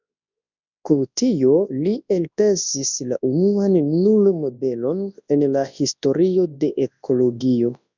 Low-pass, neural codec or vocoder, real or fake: 7.2 kHz; codec, 24 kHz, 0.9 kbps, WavTokenizer, large speech release; fake